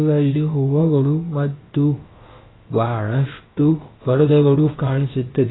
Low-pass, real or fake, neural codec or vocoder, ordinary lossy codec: 7.2 kHz; fake; codec, 16 kHz, about 1 kbps, DyCAST, with the encoder's durations; AAC, 16 kbps